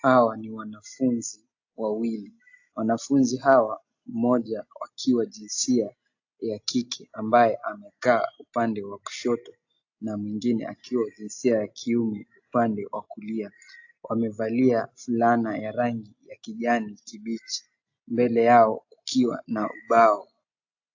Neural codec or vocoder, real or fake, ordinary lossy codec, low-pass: none; real; AAC, 48 kbps; 7.2 kHz